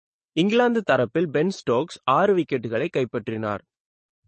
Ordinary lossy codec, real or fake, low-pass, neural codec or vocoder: MP3, 32 kbps; fake; 10.8 kHz; codec, 44.1 kHz, 7.8 kbps, DAC